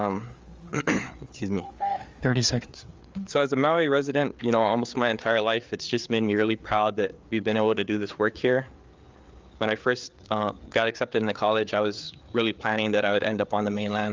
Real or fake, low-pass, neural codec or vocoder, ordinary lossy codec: fake; 7.2 kHz; codec, 16 kHz, 4 kbps, FreqCodec, larger model; Opus, 32 kbps